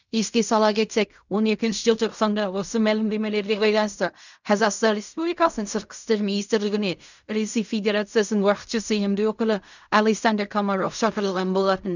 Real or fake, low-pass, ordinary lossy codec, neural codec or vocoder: fake; 7.2 kHz; none; codec, 16 kHz in and 24 kHz out, 0.4 kbps, LongCat-Audio-Codec, fine tuned four codebook decoder